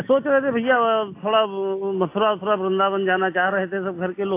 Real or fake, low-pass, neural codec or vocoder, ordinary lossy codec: real; 3.6 kHz; none; AAC, 24 kbps